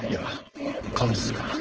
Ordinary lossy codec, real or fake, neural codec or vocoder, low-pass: Opus, 16 kbps; fake; codec, 16 kHz, 4.8 kbps, FACodec; 7.2 kHz